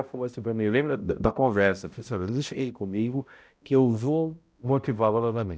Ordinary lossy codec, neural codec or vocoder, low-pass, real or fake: none; codec, 16 kHz, 0.5 kbps, X-Codec, HuBERT features, trained on balanced general audio; none; fake